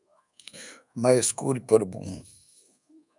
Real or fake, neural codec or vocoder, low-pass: fake; codec, 24 kHz, 1.2 kbps, DualCodec; 10.8 kHz